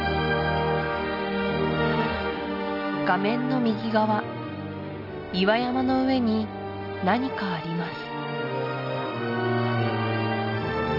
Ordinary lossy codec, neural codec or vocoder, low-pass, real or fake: none; none; 5.4 kHz; real